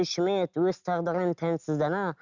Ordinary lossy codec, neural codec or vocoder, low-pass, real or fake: none; none; 7.2 kHz; real